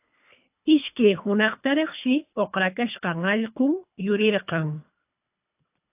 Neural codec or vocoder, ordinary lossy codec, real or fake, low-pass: codec, 24 kHz, 3 kbps, HILCodec; AAC, 32 kbps; fake; 3.6 kHz